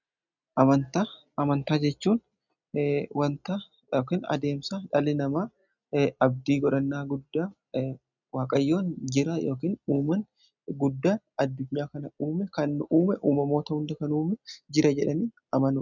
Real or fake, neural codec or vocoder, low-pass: real; none; 7.2 kHz